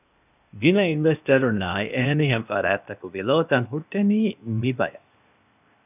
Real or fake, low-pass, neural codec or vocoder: fake; 3.6 kHz; codec, 16 kHz, 0.8 kbps, ZipCodec